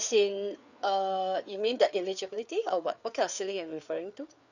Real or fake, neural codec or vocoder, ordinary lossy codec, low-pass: fake; codec, 16 kHz, 4 kbps, FreqCodec, larger model; none; 7.2 kHz